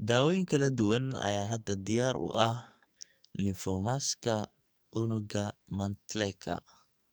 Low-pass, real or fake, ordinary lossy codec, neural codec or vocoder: none; fake; none; codec, 44.1 kHz, 2.6 kbps, SNAC